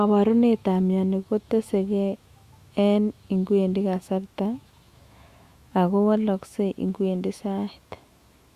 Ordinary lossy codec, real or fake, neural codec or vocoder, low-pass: Opus, 64 kbps; fake; autoencoder, 48 kHz, 128 numbers a frame, DAC-VAE, trained on Japanese speech; 14.4 kHz